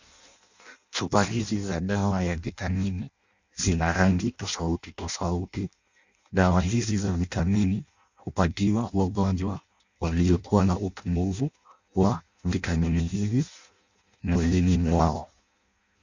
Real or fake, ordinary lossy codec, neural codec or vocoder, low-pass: fake; Opus, 64 kbps; codec, 16 kHz in and 24 kHz out, 0.6 kbps, FireRedTTS-2 codec; 7.2 kHz